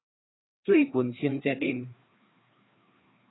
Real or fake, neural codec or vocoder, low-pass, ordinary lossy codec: fake; codec, 16 kHz, 2 kbps, FreqCodec, larger model; 7.2 kHz; AAC, 16 kbps